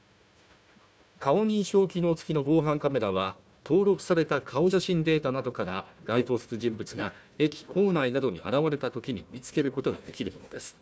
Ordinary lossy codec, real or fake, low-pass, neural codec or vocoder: none; fake; none; codec, 16 kHz, 1 kbps, FunCodec, trained on Chinese and English, 50 frames a second